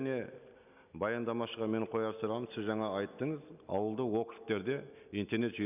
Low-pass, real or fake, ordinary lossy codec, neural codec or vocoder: 3.6 kHz; real; none; none